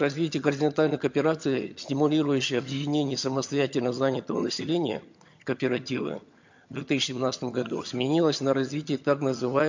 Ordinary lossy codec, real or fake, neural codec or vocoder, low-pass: MP3, 48 kbps; fake; vocoder, 22.05 kHz, 80 mel bands, HiFi-GAN; 7.2 kHz